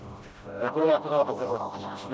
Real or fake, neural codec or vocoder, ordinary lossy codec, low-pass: fake; codec, 16 kHz, 0.5 kbps, FreqCodec, smaller model; none; none